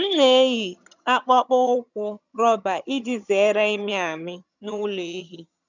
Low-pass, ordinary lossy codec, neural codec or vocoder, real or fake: 7.2 kHz; none; vocoder, 22.05 kHz, 80 mel bands, HiFi-GAN; fake